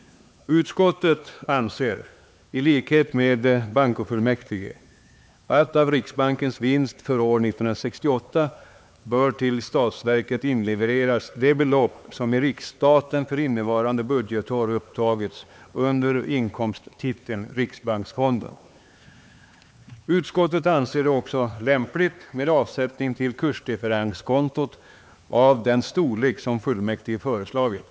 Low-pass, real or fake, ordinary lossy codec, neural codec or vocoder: none; fake; none; codec, 16 kHz, 4 kbps, X-Codec, HuBERT features, trained on LibriSpeech